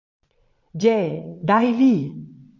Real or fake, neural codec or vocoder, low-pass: fake; vocoder, 22.05 kHz, 80 mel bands, Vocos; 7.2 kHz